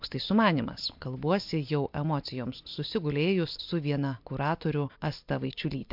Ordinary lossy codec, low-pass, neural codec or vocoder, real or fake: MP3, 48 kbps; 5.4 kHz; none; real